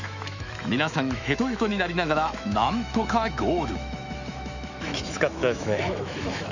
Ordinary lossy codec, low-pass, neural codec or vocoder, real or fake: none; 7.2 kHz; autoencoder, 48 kHz, 128 numbers a frame, DAC-VAE, trained on Japanese speech; fake